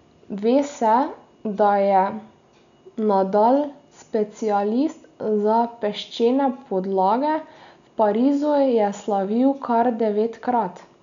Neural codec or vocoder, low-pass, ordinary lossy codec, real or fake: none; 7.2 kHz; none; real